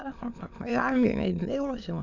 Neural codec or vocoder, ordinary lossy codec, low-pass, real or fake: autoencoder, 22.05 kHz, a latent of 192 numbers a frame, VITS, trained on many speakers; none; 7.2 kHz; fake